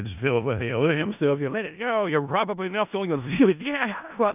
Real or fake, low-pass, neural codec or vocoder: fake; 3.6 kHz; codec, 16 kHz in and 24 kHz out, 0.4 kbps, LongCat-Audio-Codec, four codebook decoder